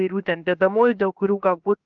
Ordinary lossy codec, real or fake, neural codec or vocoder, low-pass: Opus, 24 kbps; fake; codec, 16 kHz, 0.7 kbps, FocalCodec; 7.2 kHz